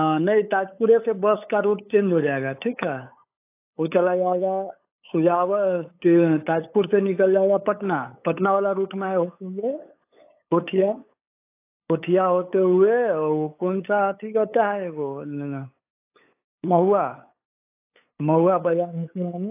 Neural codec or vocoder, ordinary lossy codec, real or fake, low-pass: codec, 16 kHz, 8 kbps, FunCodec, trained on LibriTTS, 25 frames a second; AAC, 24 kbps; fake; 3.6 kHz